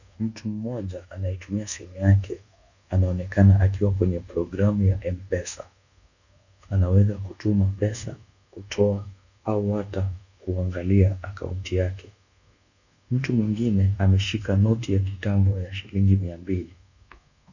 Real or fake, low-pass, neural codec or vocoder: fake; 7.2 kHz; codec, 24 kHz, 1.2 kbps, DualCodec